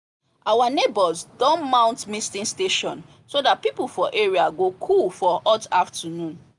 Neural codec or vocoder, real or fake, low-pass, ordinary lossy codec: none; real; 10.8 kHz; none